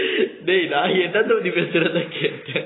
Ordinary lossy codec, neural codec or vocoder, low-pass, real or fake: AAC, 16 kbps; none; 7.2 kHz; real